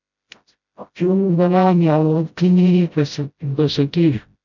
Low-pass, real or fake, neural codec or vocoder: 7.2 kHz; fake; codec, 16 kHz, 0.5 kbps, FreqCodec, smaller model